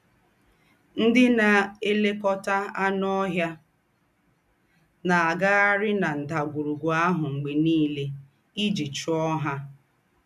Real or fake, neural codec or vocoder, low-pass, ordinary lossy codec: real; none; 14.4 kHz; none